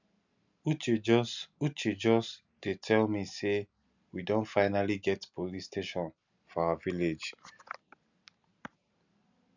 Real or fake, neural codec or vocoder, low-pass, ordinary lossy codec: real; none; 7.2 kHz; none